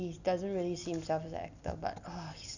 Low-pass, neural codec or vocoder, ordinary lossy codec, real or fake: 7.2 kHz; none; none; real